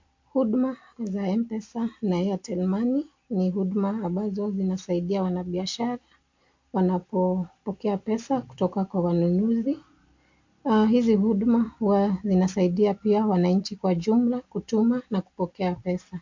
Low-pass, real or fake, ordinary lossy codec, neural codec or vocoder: 7.2 kHz; real; MP3, 48 kbps; none